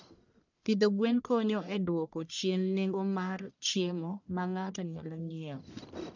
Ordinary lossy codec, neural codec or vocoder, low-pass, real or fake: none; codec, 44.1 kHz, 1.7 kbps, Pupu-Codec; 7.2 kHz; fake